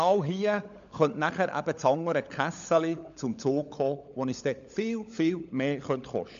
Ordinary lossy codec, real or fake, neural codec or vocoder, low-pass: MP3, 64 kbps; fake; codec, 16 kHz, 16 kbps, FunCodec, trained on LibriTTS, 50 frames a second; 7.2 kHz